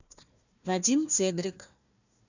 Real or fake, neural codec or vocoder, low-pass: fake; codec, 16 kHz, 1 kbps, FunCodec, trained on Chinese and English, 50 frames a second; 7.2 kHz